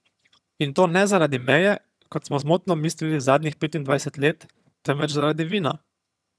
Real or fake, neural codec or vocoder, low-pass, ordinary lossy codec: fake; vocoder, 22.05 kHz, 80 mel bands, HiFi-GAN; none; none